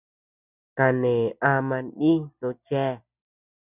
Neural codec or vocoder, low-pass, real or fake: none; 3.6 kHz; real